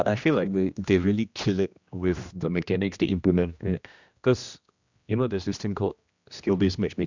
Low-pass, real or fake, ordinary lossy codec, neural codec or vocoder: 7.2 kHz; fake; Opus, 64 kbps; codec, 16 kHz, 1 kbps, X-Codec, HuBERT features, trained on general audio